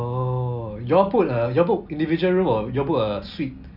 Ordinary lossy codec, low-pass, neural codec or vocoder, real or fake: none; 5.4 kHz; none; real